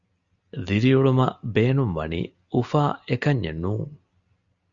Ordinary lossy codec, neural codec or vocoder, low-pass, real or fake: Opus, 64 kbps; none; 7.2 kHz; real